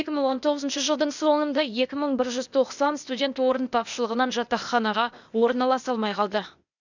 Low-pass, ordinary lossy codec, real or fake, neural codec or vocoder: 7.2 kHz; none; fake; codec, 16 kHz, 0.8 kbps, ZipCodec